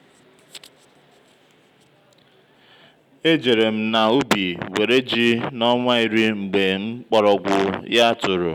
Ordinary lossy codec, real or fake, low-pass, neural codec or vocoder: none; real; 19.8 kHz; none